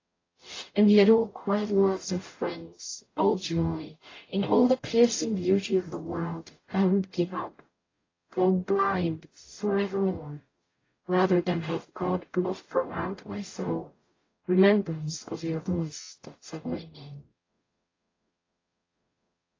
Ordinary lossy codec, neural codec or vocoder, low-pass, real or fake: AAC, 32 kbps; codec, 44.1 kHz, 0.9 kbps, DAC; 7.2 kHz; fake